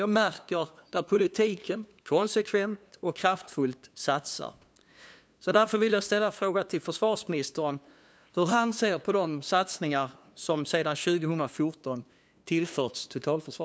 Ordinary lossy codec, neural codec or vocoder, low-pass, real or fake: none; codec, 16 kHz, 2 kbps, FunCodec, trained on LibriTTS, 25 frames a second; none; fake